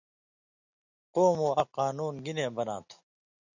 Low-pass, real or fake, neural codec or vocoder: 7.2 kHz; real; none